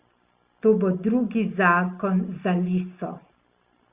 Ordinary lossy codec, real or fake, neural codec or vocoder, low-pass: Opus, 64 kbps; real; none; 3.6 kHz